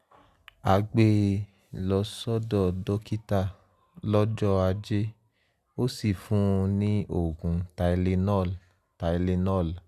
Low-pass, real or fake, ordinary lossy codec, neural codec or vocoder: 14.4 kHz; real; Opus, 64 kbps; none